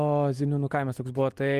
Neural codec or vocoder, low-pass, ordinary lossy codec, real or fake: none; 14.4 kHz; Opus, 24 kbps; real